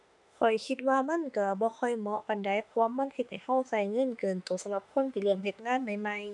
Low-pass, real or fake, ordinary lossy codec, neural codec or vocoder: 10.8 kHz; fake; none; autoencoder, 48 kHz, 32 numbers a frame, DAC-VAE, trained on Japanese speech